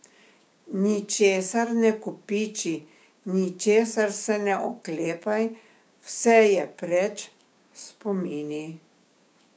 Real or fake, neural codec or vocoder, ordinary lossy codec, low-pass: fake; codec, 16 kHz, 6 kbps, DAC; none; none